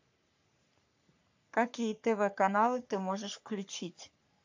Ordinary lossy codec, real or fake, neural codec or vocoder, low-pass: AAC, 48 kbps; fake; codec, 44.1 kHz, 3.4 kbps, Pupu-Codec; 7.2 kHz